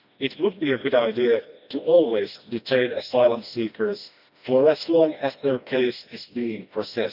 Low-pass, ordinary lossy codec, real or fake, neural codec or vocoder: 5.4 kHz; none; fake; codec, 16 kHz, 1 kbps, FreqCodec, smaller model